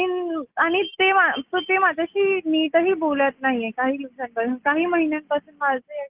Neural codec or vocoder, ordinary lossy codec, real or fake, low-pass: none; Opus, 32 kbps; real; 3.6 kHz